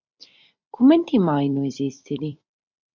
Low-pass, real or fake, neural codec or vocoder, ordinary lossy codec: 7.2 kHz; fake; vocoder, 44.1 kHz, 128 mel bands every 256 samples, BigVGAN v2; MP3, 64 kbps